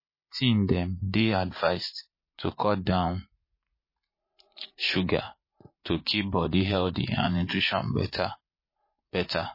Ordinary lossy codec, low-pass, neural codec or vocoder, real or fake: MP3, 24 kbps; 5.4 kHz; vocoder, 44.1 kHz, 80 mel bands, Vocos; fake